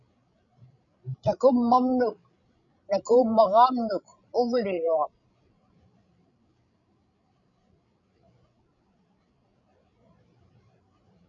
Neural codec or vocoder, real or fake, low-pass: codec, 16 kHz, 16 kbps, FreqCodec, larger model; fake; 7.2 kHz